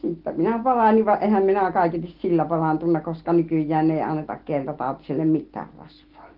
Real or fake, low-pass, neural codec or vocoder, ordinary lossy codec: real; 5.4 kHz; none; Opus, 16 kbps